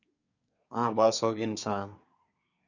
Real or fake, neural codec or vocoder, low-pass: fake; codec, 24 kHz, 1 kbps, SNAC; 7.2 kHz